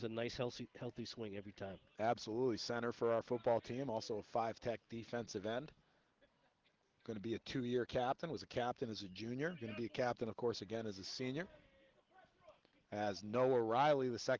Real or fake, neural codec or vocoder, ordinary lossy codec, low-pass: real; none; Opus, 16 kbps; 7.2 kHz